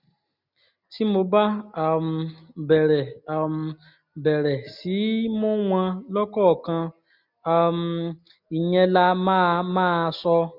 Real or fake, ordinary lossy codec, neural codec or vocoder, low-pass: real; none; none; 5.4 kHz